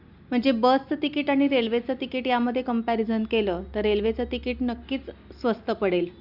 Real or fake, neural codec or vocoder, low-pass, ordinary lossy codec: real; none; 5.4 kHz; Opus, 64 kbps